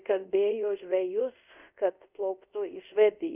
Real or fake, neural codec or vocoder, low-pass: fake; codec, 24 kHz, 0.5 kbps, DualCodec; 3.6 kHz